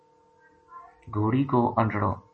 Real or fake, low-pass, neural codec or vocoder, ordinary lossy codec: real; 10.8 kHz; none; MP3, 32 kbps